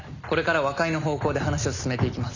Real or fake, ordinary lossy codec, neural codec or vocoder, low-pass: real; none; none; 7.2 kHz